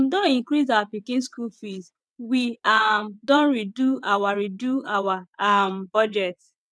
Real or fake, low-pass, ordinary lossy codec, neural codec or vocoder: fake; none; none; vocoder, 22.05 kHz, 80 mel bands, WaveNeXt